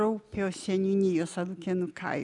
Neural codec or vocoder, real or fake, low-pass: none; real; 10.8 kHz